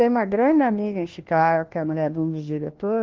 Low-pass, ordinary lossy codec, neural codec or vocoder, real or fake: 7.2 kHz; Opus, 16 kbps; codec, 16 kHz, 1 kbps, FunCodec, trained on LibriTTS, 50 frames a second; fake